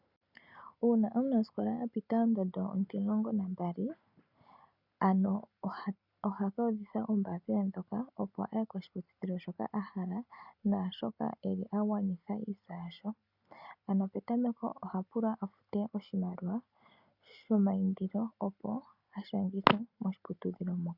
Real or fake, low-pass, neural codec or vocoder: real; 5.4 kHz; none